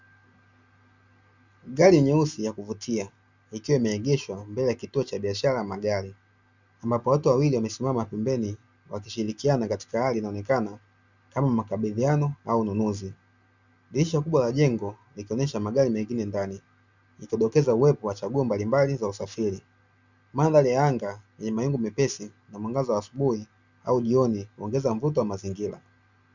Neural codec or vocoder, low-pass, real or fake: none; 7.2 kHz; real